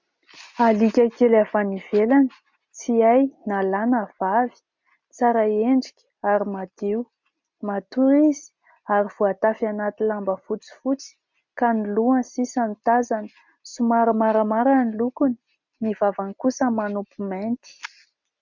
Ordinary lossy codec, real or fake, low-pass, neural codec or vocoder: MP3, 64 kbps; real; 7.2 kHz; none